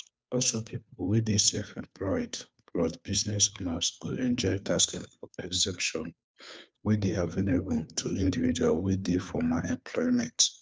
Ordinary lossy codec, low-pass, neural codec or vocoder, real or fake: none; none; codec, 16 kHz, 2 kbps, FunCodec, trained on Chinese and English, 25 frames a second; fake